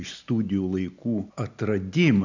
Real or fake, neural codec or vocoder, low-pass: real; none; 7.2 kHz